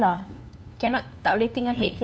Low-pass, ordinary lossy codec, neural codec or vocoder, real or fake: none; none; codec, 16 kHz, 2 kbps, FunCodec, trained on LibriTTS, 25 frames a second; fake